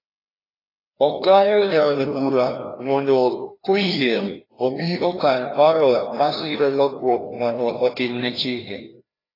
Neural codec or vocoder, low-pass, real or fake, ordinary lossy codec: codec, 16 kHz, 1 kbps, FreqCodec, larger model; 5.4 kHz; fake; AAC, 24 kbps